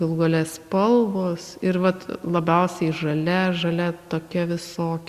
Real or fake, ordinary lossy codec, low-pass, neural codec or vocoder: real; Opus, 64 kbps; 14.4 kHz; none